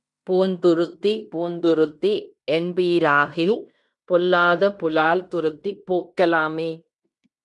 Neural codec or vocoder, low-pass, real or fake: codec, 16 kHz in and 24 kHz out, 0.9 kbps, LongCat-Audio-Codec, fine tuned four codebook decoder; 10.8 kHz; fake